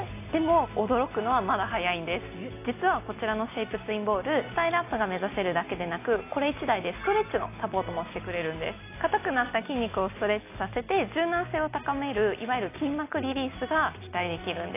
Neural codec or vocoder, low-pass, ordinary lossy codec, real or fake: none; 3.6 kHz; AAC, 24 kbps; real